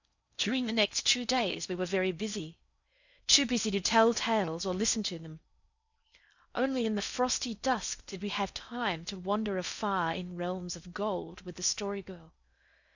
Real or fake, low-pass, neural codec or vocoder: fake; 7.2 kHz; codec, 16 kHz in and 24 kHz out, 0.6 kbps, FocalCodec, streaming, 4096 codes